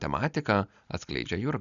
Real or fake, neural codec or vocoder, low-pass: real; none; 7.2 kHz